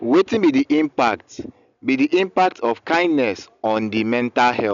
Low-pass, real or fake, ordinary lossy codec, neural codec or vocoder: 7.2 kHz; real; none; none